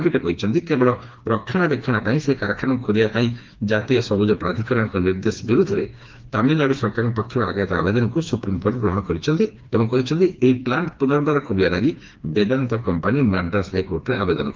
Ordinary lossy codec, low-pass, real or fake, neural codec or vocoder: Opus, 32 kbps; 7.2 kHz; fake; codec, 16 kHz, 2 kbps, FreqCodec, smaller model